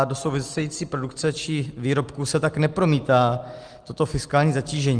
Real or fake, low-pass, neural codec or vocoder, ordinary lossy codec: real; 9.9 kHz; none; Opus, 24 kbps